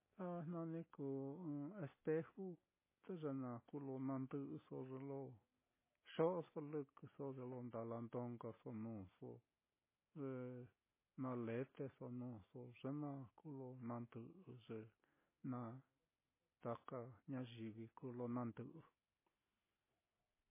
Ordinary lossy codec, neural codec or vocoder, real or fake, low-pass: MP3, 16 kbps; none; real; 3.6 kHz